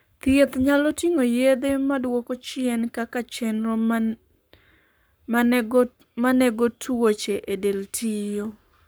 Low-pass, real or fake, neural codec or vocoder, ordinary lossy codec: none; fake; codec, 44.1 kHz, 7.8 kbps, Pupu-Codec; none